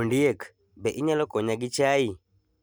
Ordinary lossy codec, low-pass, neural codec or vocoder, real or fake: none; none; none; real